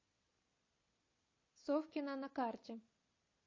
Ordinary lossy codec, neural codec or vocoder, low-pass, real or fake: MP3, 32 kbps; none; 7.2 kHz; real